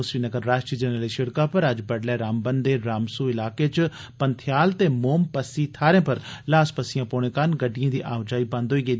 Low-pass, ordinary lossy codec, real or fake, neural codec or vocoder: none; none; real; none